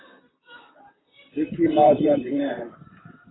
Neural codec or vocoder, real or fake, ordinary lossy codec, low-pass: vocoder, 44.1 kHz, 128 mel bands, Pupu-Vocoder; fake; AAC, 16 kbps; 7.2 kHz